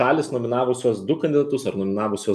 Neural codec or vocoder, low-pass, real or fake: none; 14.4 kHz; real